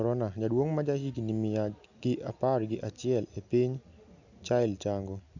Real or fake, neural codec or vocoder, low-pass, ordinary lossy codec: real; none; 7.2 kHz; none